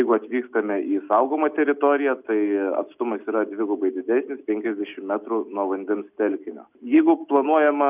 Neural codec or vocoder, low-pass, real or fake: none; 3.6 kHz; real